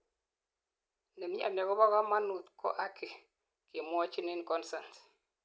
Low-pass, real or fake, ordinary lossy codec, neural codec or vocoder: none; real; none; none